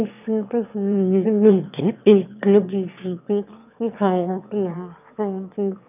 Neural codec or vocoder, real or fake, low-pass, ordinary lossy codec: autoencoder, 22.05 kHz, a latent of 192 numbers a frame, VITS, trained on one speaker; fake; 3.6 kHz; none